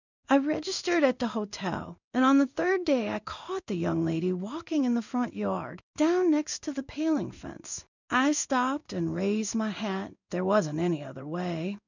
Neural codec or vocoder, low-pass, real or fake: codec, 16 kHz in and 24 kHz out, 1 kbps, XY-Tokenizer; 7.2 kHz; fake